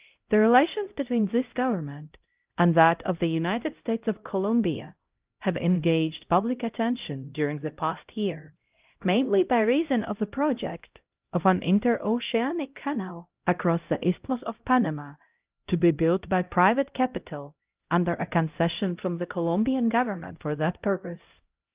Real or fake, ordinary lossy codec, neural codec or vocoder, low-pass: fake; Opus, 32 kbps; codec, 16 kHz, 0.5 kbps, X-Codec, HuBERT features, trained on LibriSpeech; 3.6 kHz